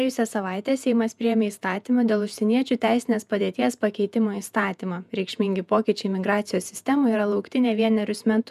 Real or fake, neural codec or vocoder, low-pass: fake; vocoder, 48 kHz, 128 mel bands, Vocos; 14.4 kHz